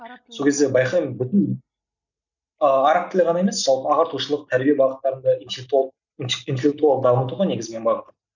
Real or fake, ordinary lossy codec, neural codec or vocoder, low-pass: real; none; none; 7.2 kHz